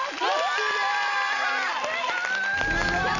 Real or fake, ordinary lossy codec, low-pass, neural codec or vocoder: real; none; 7.2 kHz; none